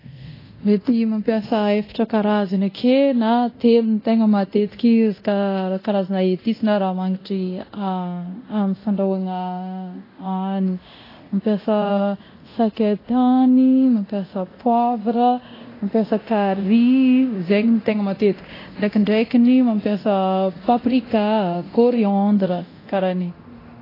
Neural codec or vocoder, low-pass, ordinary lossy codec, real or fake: codec, 24 kHz, 0.9 kbps, DualCodec; 5.4 kHz; AAC, 24 kbps; fake